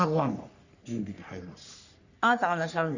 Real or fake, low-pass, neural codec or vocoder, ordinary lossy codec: fake; 7.2 kHz; codec, 44.1 kHz, 3.4 kbps, Pupu-Codec; Opus, 64 kbps